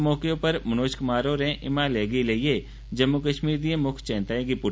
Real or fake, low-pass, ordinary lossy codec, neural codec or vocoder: real; none; none; none